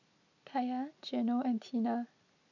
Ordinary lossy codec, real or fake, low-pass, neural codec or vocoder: none; real; 7.2 kHz; none